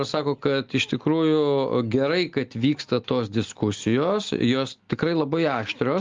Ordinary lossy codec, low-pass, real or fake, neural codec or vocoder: Opus, 32 kbps; 7.2 kHz; real; none